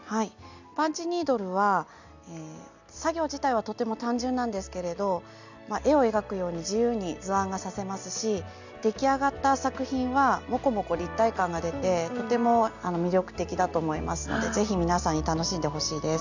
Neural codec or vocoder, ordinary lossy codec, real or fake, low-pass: none; none; real; 7.2 kHz